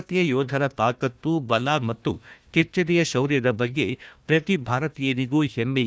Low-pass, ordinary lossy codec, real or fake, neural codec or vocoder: none; none; fake; codec, 16 kHz, 1 kbps, FunCodec, trained on Chinese and English, 50 frames a second